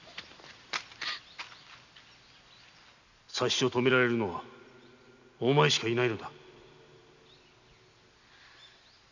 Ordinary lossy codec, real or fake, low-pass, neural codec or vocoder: none; real; 7.2 kHz; none